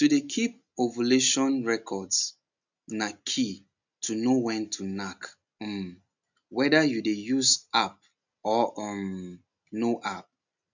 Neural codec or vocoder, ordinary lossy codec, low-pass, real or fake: none; none; 7.2 kHz; real